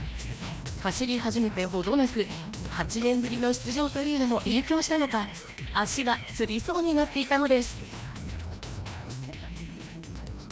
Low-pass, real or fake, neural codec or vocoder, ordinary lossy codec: none; fake; codec, 16 kHz, 1 kbps, FreqCodec, larger model; none